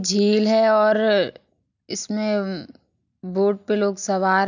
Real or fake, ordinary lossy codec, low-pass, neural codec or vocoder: real; none; 7.2 kHz; none